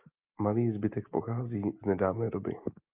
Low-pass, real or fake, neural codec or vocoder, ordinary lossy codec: 3.6 kHz; real; none; Opus, 24 kbps